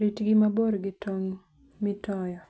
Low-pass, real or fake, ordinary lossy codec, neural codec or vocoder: none; real; none; none